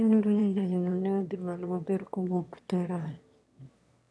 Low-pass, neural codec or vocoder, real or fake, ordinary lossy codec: none; autoencoder, 22.05 kHz, a latent of 192 numbers a frame, VITS, trained on one speaker; fake; none